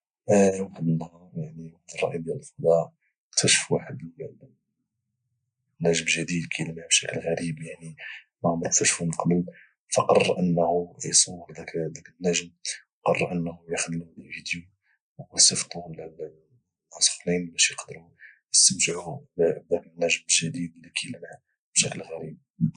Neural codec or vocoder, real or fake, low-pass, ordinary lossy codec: none; real; 9.9 kHz; none